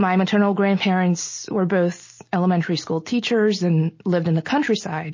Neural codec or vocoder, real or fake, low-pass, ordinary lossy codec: none; real; 7.2 kHz; MP3, 32 kbps